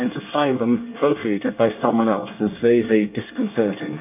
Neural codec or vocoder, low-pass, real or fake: codec, 24 kHz, 1 kbps, SNAC; 3.6 kHz; fake